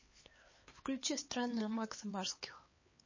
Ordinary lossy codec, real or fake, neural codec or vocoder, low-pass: MP3, 32 kbps; fake; codec, 16 kHz, 4 kbps, X-Codec, HuBERT features, trained on LibriSpeech; 7.2 kHz